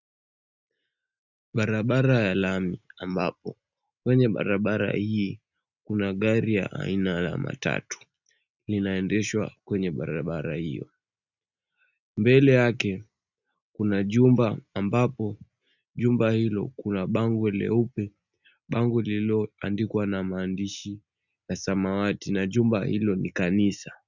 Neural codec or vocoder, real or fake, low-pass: none; real; 7.2 kHz